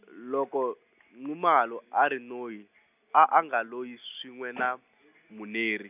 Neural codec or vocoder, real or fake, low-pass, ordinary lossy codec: none; real; 3.6 kHz; none